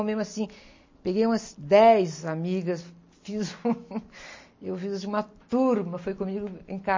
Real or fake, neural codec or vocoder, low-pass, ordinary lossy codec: real; none; 7.2 kHz; MP3, 32 kbps